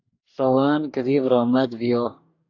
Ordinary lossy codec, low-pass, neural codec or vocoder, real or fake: AAC, 48 kbps; 7.2 kHz; codec, 44.1 kHz, 2.6 kbps, DAC; fake